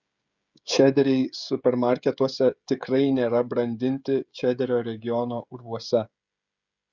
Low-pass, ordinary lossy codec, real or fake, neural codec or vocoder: 7.2 kHz; Opus, 64 kbps; fake; codec, 16 kHz, 16 kbps, FreqCodec, smaller model